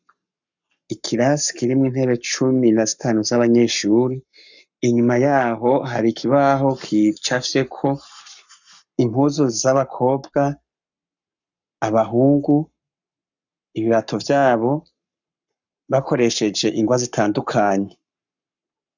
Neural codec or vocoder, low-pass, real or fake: codec, 44.1 kHz, 7.8 kbps, Pupu-Codec; 7.2 kHz; fake